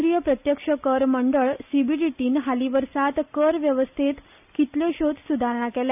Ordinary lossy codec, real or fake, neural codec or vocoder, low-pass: none; real; none; 3.6 kHz